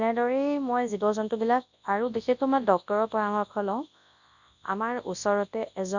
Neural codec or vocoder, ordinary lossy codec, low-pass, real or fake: codec, 24 kHz, 0.9 kbps, WavTokenizer, large speech release; none; 7.2 kHz; fake